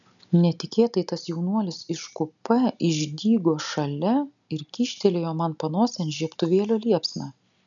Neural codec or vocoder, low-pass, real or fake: none; 7.2 kHz; real